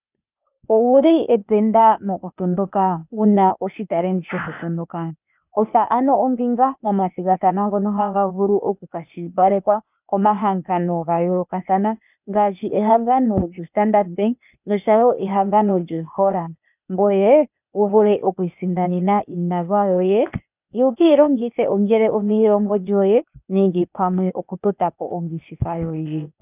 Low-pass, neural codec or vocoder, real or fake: 3.6 kHz; codec, 16 kHz, 0.8 kbps, ZipCodec; fake